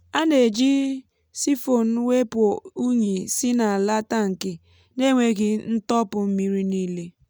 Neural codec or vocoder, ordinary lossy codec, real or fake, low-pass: none; none; real; none